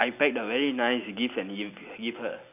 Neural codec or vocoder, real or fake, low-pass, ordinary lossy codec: none; real; 3.6 kHz; none